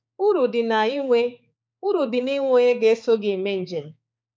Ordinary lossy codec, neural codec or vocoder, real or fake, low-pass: none; codec, 16 kHz, 4 kbps, X-Codec, HuBERT features, trained on balanced general audio; fake; none